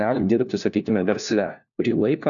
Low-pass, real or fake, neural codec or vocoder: 7.2 kHz; fake; codec, 16 kHz, 1 kbps, FunCodec, trained on LibriTTS, 50 frames a second